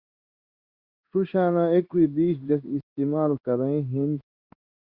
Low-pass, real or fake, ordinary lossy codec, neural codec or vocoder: 5.4 kHz; fake; Opus, 64 kbps; codec, 16 kHz in and 24 kHz out, 1 kbps, XY-Tokenizer